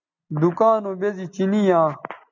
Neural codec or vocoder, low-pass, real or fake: none; 7.2 kHz; real